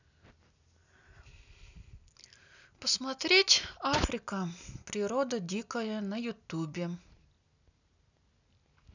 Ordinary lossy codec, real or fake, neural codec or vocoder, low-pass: none; real; none; 7.2 kHz